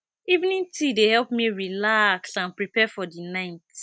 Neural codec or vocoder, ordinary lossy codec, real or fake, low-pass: none; none; real; none